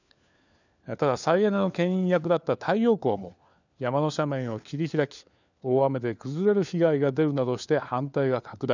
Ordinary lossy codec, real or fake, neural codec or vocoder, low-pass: none; fake; codec, 16 kHz, 4 kbps, FunCodec, trained on LibriTTS, 50 frames a second; 7.2 kHz